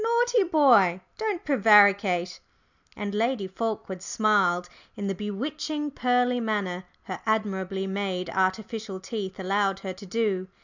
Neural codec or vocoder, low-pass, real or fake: none; 7.2 kHz; real